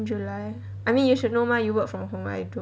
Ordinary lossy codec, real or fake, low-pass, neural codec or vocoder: none; real; none; none